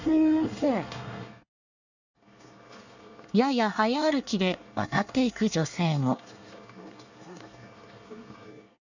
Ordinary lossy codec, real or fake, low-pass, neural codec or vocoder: none; fake; 7.2 kHz; codec, 24 kHz, 1 kbps, SNAC